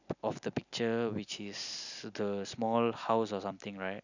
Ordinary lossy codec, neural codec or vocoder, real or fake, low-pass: none; none; real; 7.2 kHz